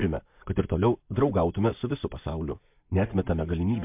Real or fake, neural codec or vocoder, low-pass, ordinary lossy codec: real; none; 3.6 kHz; MP3, 32 kbps